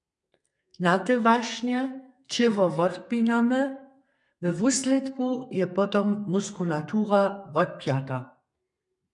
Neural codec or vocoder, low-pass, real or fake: codec, 32 kHz, 1.9 kbps, SNAC; 10.8 kHz; fake